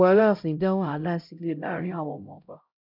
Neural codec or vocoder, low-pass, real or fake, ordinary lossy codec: codec, 16 kHz, 0.5 kbps, X-Codec, HuBERT features, trained on LibriSpeech; 5.4 kHz; fake; none